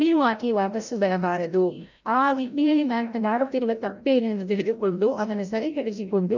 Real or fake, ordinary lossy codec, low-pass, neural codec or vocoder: fake; none; 7.2 kHz; codec, 16 kHz, 0.5 kbps, FreqCodec, larger model